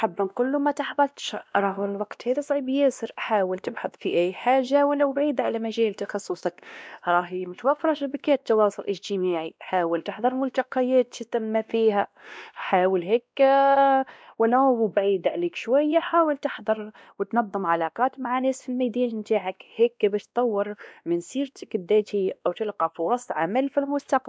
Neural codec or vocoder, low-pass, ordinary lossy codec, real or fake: codec, 16 kHz, 1 kbps, X-Codec, WavLM features, trained on Multilingual LibriSpeech; none; none; fake